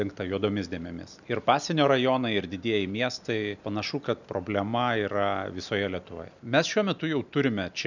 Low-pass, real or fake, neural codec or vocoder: 7.2 kHz; real; none